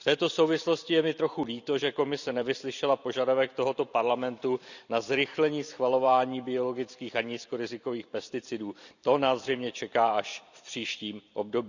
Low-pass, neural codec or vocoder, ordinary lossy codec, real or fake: 7.2 kHz; vocoder, 44.1 kHz, 128 mel bands every 256 samples, BigVGAN v2; none; fake